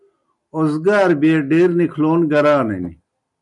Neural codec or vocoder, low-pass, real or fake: none; 10.8 kHz; real